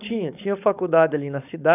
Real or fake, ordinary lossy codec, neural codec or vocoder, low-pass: fake; none; codec, 16 kHz, 4.8 kbps, FACodec; 3.6 kHz